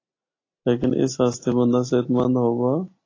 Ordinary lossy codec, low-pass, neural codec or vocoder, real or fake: AAC, 32 kbps; 7.2 kHz; none; real